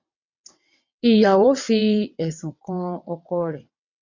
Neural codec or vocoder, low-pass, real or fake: vocoder, 22.05 kHz, 80 mel bands, WaveNeXt; 7.2 kHz; fake